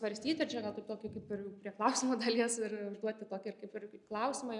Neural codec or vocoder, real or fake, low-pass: none; real; 10.8 kHz